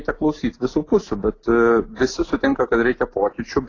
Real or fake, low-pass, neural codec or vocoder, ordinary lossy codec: real; 7.2 kHz; none; AAC, 32 kbps